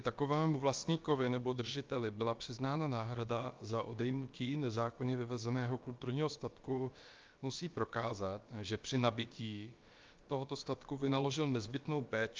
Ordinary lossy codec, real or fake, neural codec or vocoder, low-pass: Opus, 24 kbps; fake; codec, 16 kHz, about 1 kbps, DyCAST, with the encoder's durations; 7.2 kHz